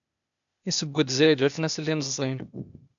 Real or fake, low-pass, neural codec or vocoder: fake; 7.2 kHz; codec, 16 kHz, 0.8 kbps, ZipCodec